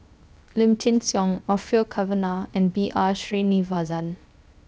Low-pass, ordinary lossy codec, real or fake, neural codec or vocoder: none; none; fake; codec, 16 kHz, 0.7 kbps, FocalCodec